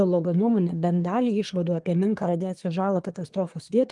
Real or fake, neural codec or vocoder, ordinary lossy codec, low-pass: fake; codec, 24 kHz, 1 kbps, SNAC; Opus, 24 kbps; 10.8 kHz